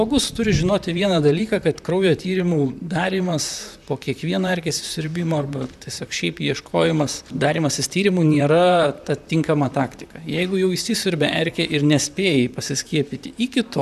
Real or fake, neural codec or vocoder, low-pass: fake; vocoder, 44.1 kHz, 128 mel bands, Pupu-Vocoder; 14.4 kHz